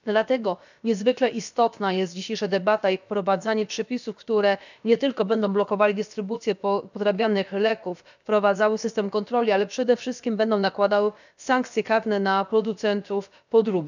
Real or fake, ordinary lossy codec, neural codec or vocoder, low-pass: fake; none; codec, 16 kHz, about 1 kbps, DyCAST, with the encoder's durations; 7.2 kHz